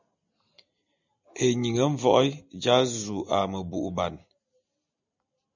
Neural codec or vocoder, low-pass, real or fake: none; 7.2 kHz; real